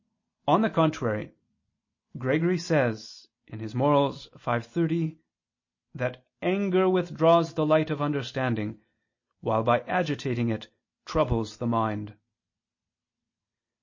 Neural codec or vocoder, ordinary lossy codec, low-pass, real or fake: none; MP3, 32 kbps; 7.2 kHz; real